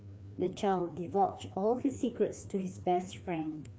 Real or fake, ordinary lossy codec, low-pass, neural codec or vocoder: fake; none; none; codec, 16 kHz, 2 kbps, FreqCodec, larger model